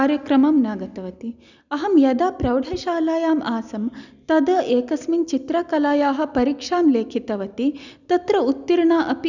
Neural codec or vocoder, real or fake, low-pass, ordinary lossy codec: none; real; 7.2 kHz; none